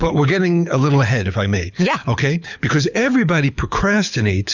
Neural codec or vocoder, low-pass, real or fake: codec, 24 kHz, 6 kbps, HILCodec; 7.2 kHz; fake